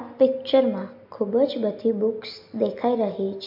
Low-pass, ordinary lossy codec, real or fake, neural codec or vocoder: 5.4 kHz; MP3, 32 kbps; real; none